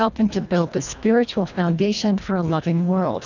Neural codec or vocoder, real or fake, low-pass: codec, 24 kHz, 1.5 kbps, HILCodec; fake; 7.2 kHz